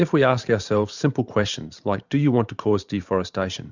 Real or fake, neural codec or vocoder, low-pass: fake; vocoder, 44.1 kHz, 128 mel bands every 512 samples, BigVGAN v2; 7.2 kHz